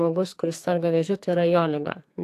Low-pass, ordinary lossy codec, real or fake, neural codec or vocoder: 14.4 kHz; AAC, 64 kbps; fake; codec, 44.1 kHz, 2.6 kbps, SNAC